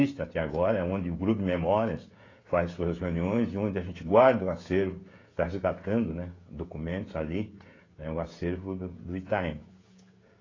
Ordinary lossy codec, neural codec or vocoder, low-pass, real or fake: AAC, 32 kbps; codec, 16 kHz, 16 kbps, FreqCodec, smaller model; 7.2 kHz; fake